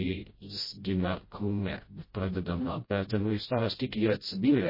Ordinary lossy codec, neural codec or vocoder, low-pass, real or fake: MP3, 24 kbps; codec, 16 kHz, 0.5 kbps, FreqCodec, smaller model; 5.4 kHz; fake